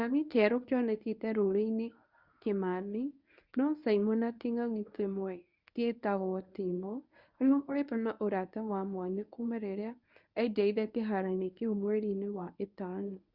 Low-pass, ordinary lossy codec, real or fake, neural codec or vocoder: 5.4 kHz; Opus, 64 kbps; fake; codec, 24 kHz, 0.9 kbps, WavTokenizer, medium speech release version 1